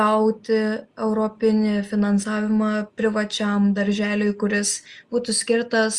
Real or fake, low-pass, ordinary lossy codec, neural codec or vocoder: real; 10.8 kHz; Opus, 32 kbps; none